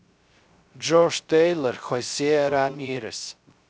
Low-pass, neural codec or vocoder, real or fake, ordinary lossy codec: none; codec, 16 kHz, 0.3 kbps, FocalCodec; fake; none